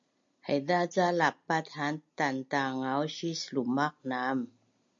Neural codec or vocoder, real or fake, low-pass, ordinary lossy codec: none; real; 7.2 kHz; MP3, 48 kbps